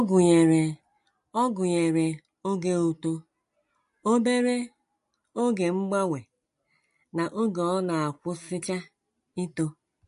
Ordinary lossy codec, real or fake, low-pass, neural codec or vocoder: MP3, 48 kbps; real; 10.8 kHz; none